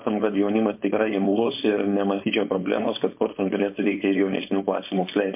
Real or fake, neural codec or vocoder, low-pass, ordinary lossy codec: fake; codec, 16 kHz, 4.8 kbps, FACodec; 3.6 kHz; MP3, 32 kbps